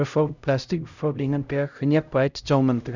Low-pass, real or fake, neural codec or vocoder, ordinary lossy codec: 7.2 kHz; fake; codec, 16 kHz, 0.5 kbps, X-Codec, HuBERT features, trained on LibriSpeech; none